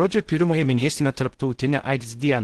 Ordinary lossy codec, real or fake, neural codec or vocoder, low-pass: Opus, 16 kbps; fake; codec, 16 kHz in and 24 kHz out, 0.6 kbps, FocalCodec, streaming, 2048 codes; 10.8 kHz